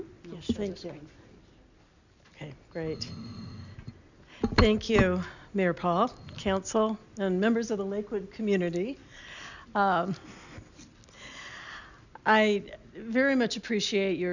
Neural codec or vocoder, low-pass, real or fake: none; 7.2 kHz; real